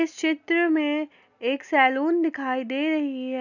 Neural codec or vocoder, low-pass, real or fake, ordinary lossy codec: none; 7.2 kHz; real; none